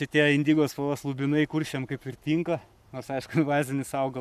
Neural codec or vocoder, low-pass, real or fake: codec, 44.1 kHz, 7.8 kbps, Pupu-Codec; 14.4 kHz; fake